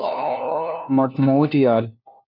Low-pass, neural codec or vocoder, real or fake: 5.4 kHz; codec, 16 kHz, 1 kbps, FunCodec, trained on LibriTTS, 50 frames a second; fake